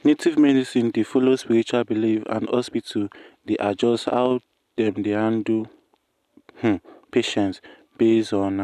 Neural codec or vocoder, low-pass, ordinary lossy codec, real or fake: none; 14.4 kHz; none; real